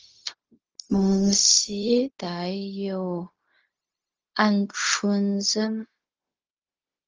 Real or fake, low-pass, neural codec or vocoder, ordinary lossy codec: fake; 7.2 kHz; codec, 16 kHz, 0.4 kbps, LongCat-Audio-Codec; Opus, 24 kbps